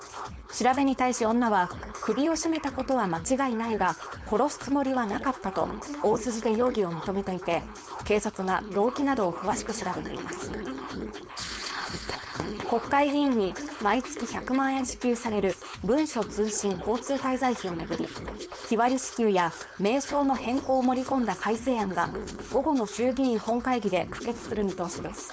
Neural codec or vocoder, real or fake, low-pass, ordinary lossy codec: codec, 16 kHz, 4.8 kbps, FACodec; fake; none; none